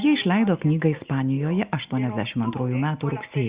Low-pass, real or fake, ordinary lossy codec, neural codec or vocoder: 3.6 kHz; real; Opus, 64 kbps; none